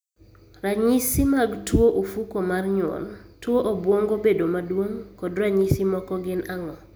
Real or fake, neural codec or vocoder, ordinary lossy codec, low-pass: real; none; none; none